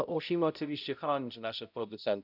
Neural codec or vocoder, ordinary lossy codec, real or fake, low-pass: codec, 16 kHz, 0.5 kbps, X-Codec, HuBERT features, trained on balanced general audio; none; fake; 5.4 kHz